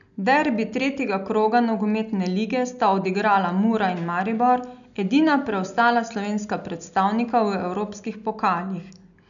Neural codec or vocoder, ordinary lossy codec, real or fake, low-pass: none; none; real; 7.2 kHz